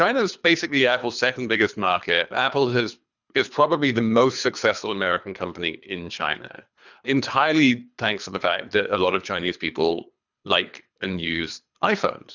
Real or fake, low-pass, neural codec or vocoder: fake; 7.2 kHz; codec, 24 kHz, 3 kbps, HILCodec